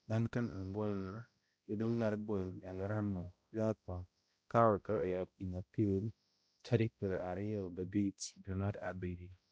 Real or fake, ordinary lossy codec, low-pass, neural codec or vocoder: fake; none; none; codec, 16 kHz, 0.5 kbps, X-Codec, HuBERT features, trained on balanced general audio